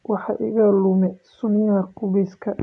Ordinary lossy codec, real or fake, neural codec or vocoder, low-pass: none; real; none; 10.8 kHz